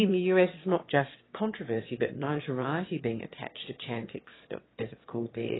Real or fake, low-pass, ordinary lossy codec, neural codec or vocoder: fake; 7.2 kHz; AAC, 16 kbps; autoencoder, 22.05 kHz, a latent of 192 numbers a frame, VITS, trained on one speaker